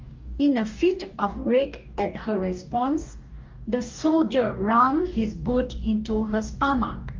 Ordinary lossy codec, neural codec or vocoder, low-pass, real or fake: Opus, 32 kbps; codec, 44.1 kHz, 2.6 kbps, DAC; 7.2 kHz; fake